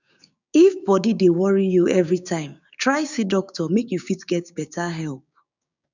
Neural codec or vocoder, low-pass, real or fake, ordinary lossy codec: codec, 16 kHz, 6 kbps, DAC; 7.2 kHz; fake; none